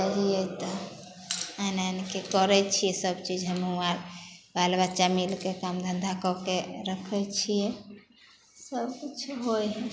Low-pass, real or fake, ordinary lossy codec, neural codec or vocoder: none; real; none; none